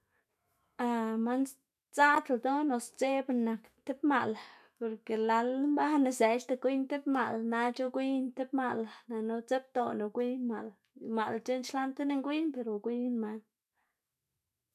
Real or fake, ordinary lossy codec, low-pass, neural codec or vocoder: fake; none; 14.4 kHz; autoencoder, 48 kHz, 128 numbers a frame, DAC-VAE, trained on Japanese speech